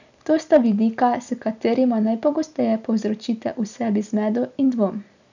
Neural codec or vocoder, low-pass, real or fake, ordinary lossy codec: none; 7.2 kHz; real; none